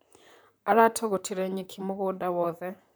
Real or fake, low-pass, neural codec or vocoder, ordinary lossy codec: fake; none; vocoder, 44.1 kHz, 128 mel bands, Pupu-Vocoder; none